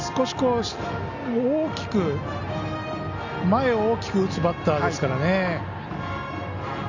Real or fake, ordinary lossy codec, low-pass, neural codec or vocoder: real; none; 7.2 kHz; none